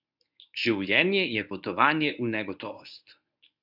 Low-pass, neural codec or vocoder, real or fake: 5.4 kHz; codec, 24 kHz, 0.9 kbps, WavTokenizer, medium speech release version 1; fake